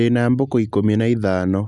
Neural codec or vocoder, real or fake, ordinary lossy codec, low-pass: none; real; Opus, 64 kbps; 10.8 kHz